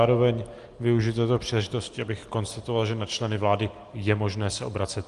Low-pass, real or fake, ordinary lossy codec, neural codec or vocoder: 10.8 kHz; real; Opus, 24 kbps; none